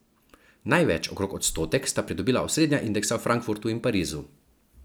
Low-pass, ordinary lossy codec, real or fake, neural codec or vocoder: none; none; real; none